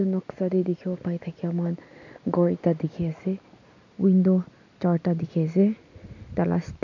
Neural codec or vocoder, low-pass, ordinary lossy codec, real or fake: none; 7.2 kHz; none; real